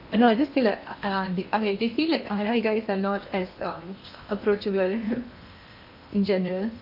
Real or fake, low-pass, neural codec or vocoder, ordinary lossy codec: fake; 5.4 kHz; codec, 16 kHz in and 24 kHz out, 0.8 kbps, FocalCodec, streaming, 65536 codes; AAC, 48 kbps